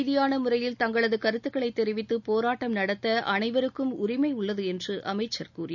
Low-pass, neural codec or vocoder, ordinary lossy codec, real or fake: 7.2 kHz; none; none; real